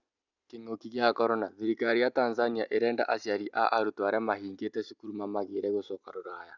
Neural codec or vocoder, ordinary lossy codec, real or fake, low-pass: none; none; real; 7.2 kHz